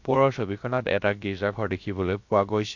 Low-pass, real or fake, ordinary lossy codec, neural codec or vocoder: 7.2 kHz; fake; MP3, 48 kbps; codec, 16 kHz, 0.7 kbps, FocalCodec